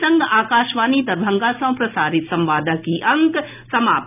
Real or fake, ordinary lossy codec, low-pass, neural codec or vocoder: real; none; 3.6 kHz; none